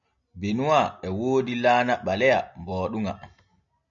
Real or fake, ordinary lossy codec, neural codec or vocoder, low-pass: real; MP3, 96 kbps; none; 7.2 kHz